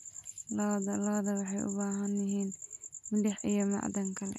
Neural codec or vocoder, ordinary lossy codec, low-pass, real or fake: none; none; 14.4 kHz; real